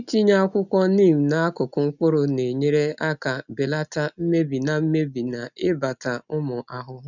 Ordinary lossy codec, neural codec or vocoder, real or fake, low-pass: none; none; real; 7.2 kHz